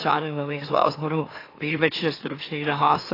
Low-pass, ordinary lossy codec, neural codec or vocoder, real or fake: 5.4 kHz; AAC, 24 kbps; autoencoder, 44.1 kHz, a latent of 192 numbers a frame, MeloTTS; fake